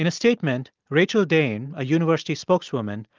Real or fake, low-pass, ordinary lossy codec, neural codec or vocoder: real; 7.2 kHz; Opus, 32 kbps; none